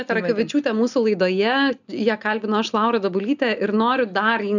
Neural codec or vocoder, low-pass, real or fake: none; 7.2 kHz; real